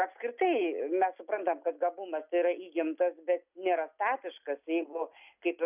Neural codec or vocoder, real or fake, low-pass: none; real; 3.6 kHz